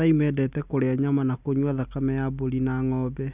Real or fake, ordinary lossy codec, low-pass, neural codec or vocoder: real; none; 3.6 kHz; none